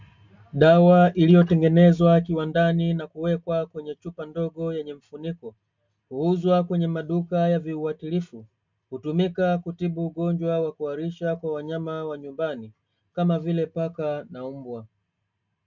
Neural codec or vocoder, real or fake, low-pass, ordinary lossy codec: none; real; 7.2 kHz; MP3, 64 kbps